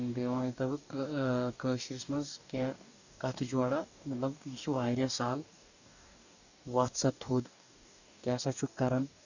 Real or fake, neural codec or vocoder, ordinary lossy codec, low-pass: fake; codec, 44.1 kHz, 2.6 kbps, DAC; none; 7.2 kHz